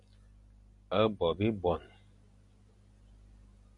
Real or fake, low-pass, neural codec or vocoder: real; 10.8 kHz; none